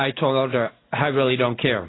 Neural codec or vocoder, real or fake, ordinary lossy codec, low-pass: none; real; AAC, 16 kbps; 7.2 kHz